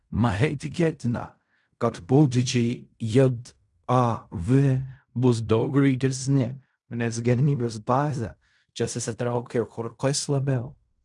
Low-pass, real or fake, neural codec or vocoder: 10.8 kHz; fake; codec, 16 kHz in and 24 kHz out, 0.4 kbps, LongCat-Audio-Codec, fine tuned four codebook decoder